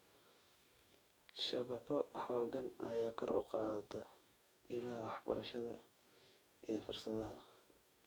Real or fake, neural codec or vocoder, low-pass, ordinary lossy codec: fake; codec, 44.1 kHz, 2.6 kbps, DAC; none; none